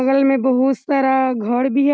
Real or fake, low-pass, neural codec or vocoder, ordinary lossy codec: real; none; none; none